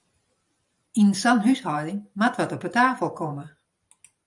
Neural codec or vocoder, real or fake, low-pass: vocoder, 44.1 kHz, 128 mel bands every 512 samples, BigVGAN v2; fake; 10.8 kHz